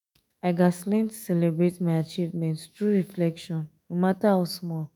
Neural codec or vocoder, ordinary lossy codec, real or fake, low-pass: autoencoder, 48 kHz, 128 numbers a frame, DAC-VAE, trained on Japanese speech; none; fake; none